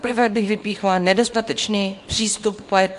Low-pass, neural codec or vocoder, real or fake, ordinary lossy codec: 10.8 kHz; codec, 24 kHz, 0.9 kbps, WavTokenizer, small release; fake; AAC, 64 kbps